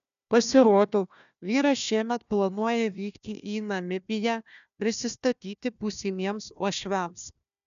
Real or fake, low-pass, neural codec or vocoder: fake; 7.2 kHz; codec, 16 kHz, 1 kbps, FunCodec, trained on Chinese and English, 50 frames a second